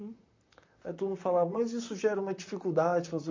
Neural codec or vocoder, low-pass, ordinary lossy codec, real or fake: vocoder, 44.1 kHz, 128 mel bands, Pupu-Vocoder; 7.2 kHz; MP3, 64 kbps; fake